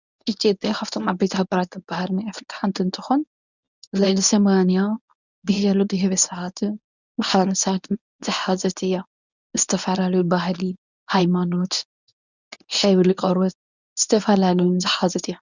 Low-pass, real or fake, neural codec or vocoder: 7.2 kHz; fake; codec, 24 kHz, 0.9 kbps, WavTokenizer, medium speech release version 1